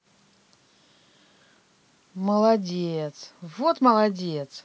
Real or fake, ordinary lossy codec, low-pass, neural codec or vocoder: real; none; none; none